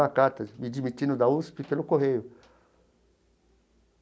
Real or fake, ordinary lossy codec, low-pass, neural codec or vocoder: real; none; none; none